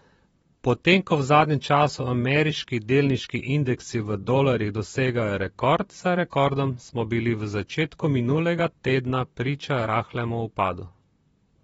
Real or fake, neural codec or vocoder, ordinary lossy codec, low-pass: fake; vocoder, 44.1 kHz, 128 mel bands, Pupu-Vocoder; AAC, 24 kbps; 19.8 kHz